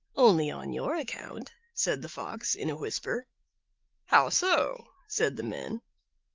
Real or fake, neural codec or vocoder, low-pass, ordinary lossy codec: fake; autoencoder, 48 kHz, 128 numbers a frame, DAC-VAE, trained on Japanese speech; 7.2 kHz; Opus, 32 kbps